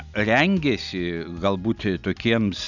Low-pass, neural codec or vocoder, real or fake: 7.2 kHz; none; real